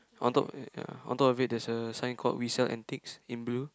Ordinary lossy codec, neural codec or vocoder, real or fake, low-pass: none; none; real; none